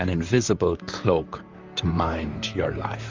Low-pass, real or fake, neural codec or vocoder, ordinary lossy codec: 7.2 kHz; fake; vocoder, 44.1 kHz, 128 mel bands, Pupu-Vocoder; Opus, 32 kbps